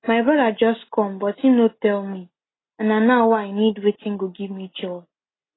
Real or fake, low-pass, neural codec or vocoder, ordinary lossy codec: real; 7.2 kHz; none; AAC, 16 kbps